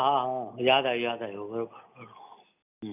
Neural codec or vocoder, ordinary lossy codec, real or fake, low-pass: none; none; real; 3.6 kHz